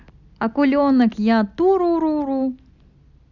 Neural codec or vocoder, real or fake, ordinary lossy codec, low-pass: none; real; none; 7.2 kHz